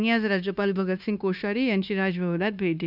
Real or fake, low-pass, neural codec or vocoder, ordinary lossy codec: fake; 5.4 kHz; codec, 16 kHz, 0.9 kbps, LongCat-Audio-Codec; none